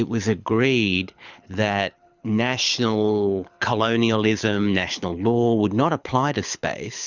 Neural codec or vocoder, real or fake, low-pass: codec, 24 kHz, 6 kbps, HILCodec; fake; 7.2 kHz